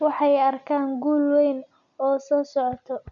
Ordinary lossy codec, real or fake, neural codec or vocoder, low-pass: none; real; none; 7.2 kHz